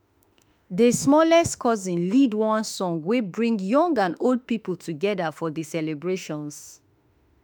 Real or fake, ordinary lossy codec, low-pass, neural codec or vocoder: fake; none; none; autoencoder, 48 kHz, 32 numbers a frame, DAC-VAE, trained on Japanese speech